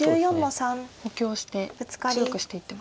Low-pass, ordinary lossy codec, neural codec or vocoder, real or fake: none; none; none; real